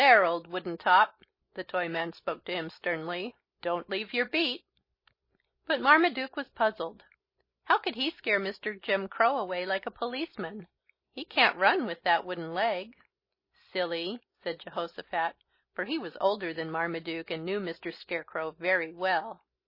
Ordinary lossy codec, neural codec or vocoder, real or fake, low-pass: MP3, 24 kbps; none; real; 5.4 kHz